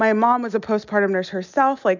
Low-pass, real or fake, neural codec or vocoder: 7.2 kHz; real; none